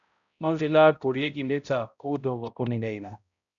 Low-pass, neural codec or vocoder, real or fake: 7.2 kHz; codec, 16 kHz, 0.5 kbps, X-Codec, HuBERT features, trained on balanced general audio; fake